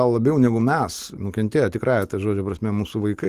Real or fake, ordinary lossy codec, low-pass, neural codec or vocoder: real; Opus, 24 kbps; 14.4 kHz; none